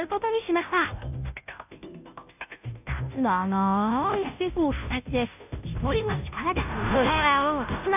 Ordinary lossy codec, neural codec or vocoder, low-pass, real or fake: none; codec, 16 kHz, 0.5 kbps, FunCodec, trained on Chinese and English, 25 frames a second; 3.6 kHz; fake